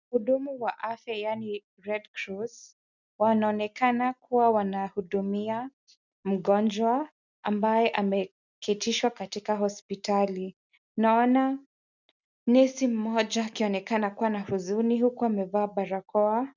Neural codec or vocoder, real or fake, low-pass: none; real; 7.2 kHz